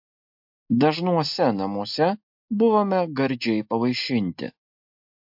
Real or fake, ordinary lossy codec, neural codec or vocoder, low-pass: real; MP3, 48 kbps; none; 5.4 kHz